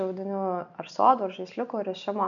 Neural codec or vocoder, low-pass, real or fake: none; 7.2 kHz; real